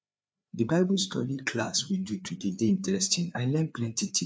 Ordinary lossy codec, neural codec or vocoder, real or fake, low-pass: none; codec, 16 kHz, 4 kbps, FreqCodec, larger model; fake; none